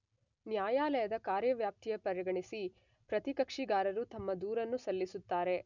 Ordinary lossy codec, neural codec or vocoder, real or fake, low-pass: none; none; real; 7.2 kHz